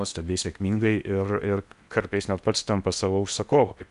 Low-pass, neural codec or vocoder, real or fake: 10.8 kHz; codec, 16 kHz in and 24 kHz out, 0.8 kbps, FocalCodec, streaming, 65536 codes; fake